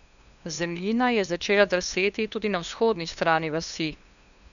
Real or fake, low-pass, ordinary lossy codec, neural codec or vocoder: fake; 7.2 kHz; none; codec, 16 kHz, 2 kbps, FunCodec, trained on LibriTTS, 25 frames a second